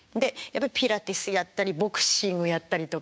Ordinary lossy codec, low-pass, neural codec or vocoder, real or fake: none; none; codec, 16 kHz, 6 kbps, DAC; fake